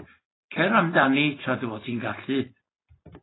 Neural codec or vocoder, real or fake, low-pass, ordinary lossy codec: none; real; 7.2 kHz; AAC, 16 kbps